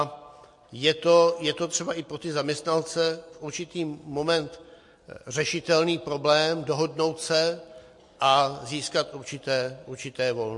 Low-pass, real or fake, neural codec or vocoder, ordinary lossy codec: 10.8 kHz; real; none; MP3, 48 kbps